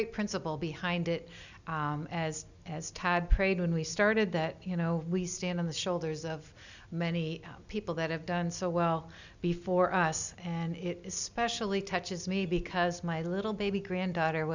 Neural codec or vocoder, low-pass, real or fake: none; 7.2 kHz; real